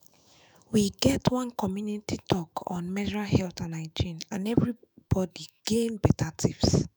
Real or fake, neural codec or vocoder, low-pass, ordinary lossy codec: fake; autoencoder, 48 kHz, 128 numbers a frame, DAC-VAE, trained on Japanese speech; none; none